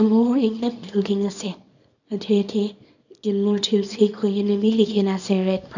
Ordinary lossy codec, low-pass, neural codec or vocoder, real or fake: none; 7.2 kHz; codec, 24 kHz, 0.9 kbps, WavTokenizer, small release; fake